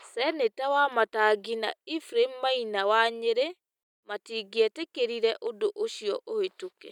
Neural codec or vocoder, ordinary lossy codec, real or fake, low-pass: none; none; real; 19.8 kHz